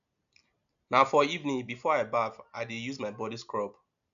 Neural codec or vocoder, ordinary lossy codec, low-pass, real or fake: none; none; 7.2 kHz; real